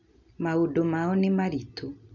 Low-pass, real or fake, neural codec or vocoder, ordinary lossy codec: 7.2 kHz; real; none; none